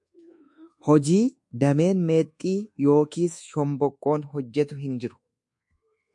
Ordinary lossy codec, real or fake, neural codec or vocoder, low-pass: MP3, 64 kbps; fake; codec, 24 kHz, 1.2 kbps, DualCodec; 10.8 kHz